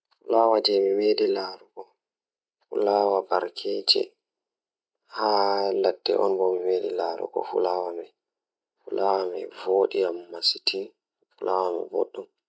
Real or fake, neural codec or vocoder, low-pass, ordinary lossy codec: real; none; none; none